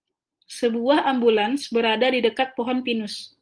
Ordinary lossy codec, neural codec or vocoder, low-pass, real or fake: Opus, 24 kbps; none; 9.9 kHz; real